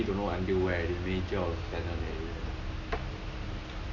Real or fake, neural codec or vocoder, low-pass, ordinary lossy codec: real; none; 7.2 kHz; none